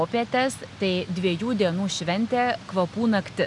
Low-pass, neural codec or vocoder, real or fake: 10.8 kHz; none; real